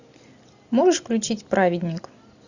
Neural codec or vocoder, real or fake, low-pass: none; real; 7.2 kHz